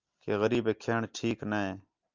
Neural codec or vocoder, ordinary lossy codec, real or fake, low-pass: none; Opus, 24 kbps; real; 7.2 kHz